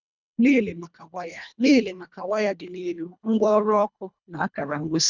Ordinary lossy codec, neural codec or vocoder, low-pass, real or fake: none; codec, 24 kHz, 1.5 kbps, HILCodec; 7.2 kHz; fake